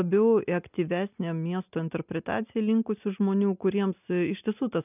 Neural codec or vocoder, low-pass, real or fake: none; 3.6 kHz; real